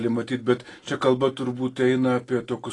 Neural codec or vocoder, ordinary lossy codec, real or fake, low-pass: none; AAC, 32 kbps; real; 10.8 kHz